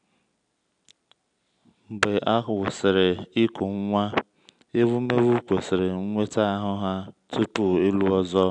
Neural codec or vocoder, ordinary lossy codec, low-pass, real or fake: none; none; 9.9 kHz; real